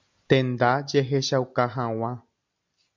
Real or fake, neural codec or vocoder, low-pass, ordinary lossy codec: real; none; 7.2 kHz; MP3, 64 kbps